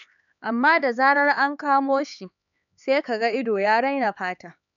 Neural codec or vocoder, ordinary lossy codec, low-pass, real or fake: codec, 16 kHz, 4 kbps, X-Codec, HuBERT features, trained on LibriSpeech; none; 7.2 kHz; fake